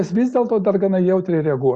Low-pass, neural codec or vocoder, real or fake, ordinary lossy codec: 9.9 kHz; none; real; Opus, 64 kbps